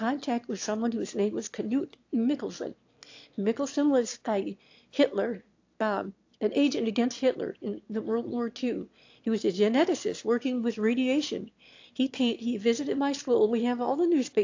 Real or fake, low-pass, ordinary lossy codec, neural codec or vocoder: fake; 7.2 kHz; AAC, 48 kbps; autoencoder, 22.05 kHz, a latent of 192 numbers a frame, VITS, trained on one speaker